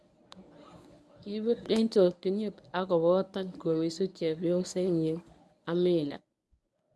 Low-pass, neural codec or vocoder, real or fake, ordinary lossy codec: none; codec, 24 kHz, 0.9 kbps, WavTokenizer, medium speech release version 1; fake; none